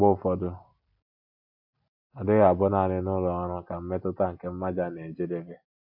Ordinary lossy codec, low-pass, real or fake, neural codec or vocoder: AAC, 48 kbps; 5.4 kHz; real; none